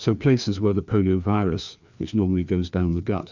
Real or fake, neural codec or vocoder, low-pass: fake; codec, 16 kHz, 2 kbps, FreqCodec, larger model; 7.2 kHz